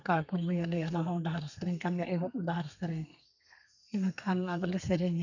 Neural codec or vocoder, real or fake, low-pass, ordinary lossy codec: codec, 32 kHz, 1.9 kbps, SNAC; fake; 7.2 kHz; none